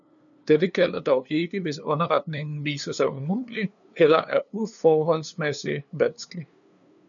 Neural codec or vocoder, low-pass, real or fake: codec, 16 kHz, 2 kbps, FunCodec, trained on LibriTTS, 25 frames a second; 7.2 kHz; fake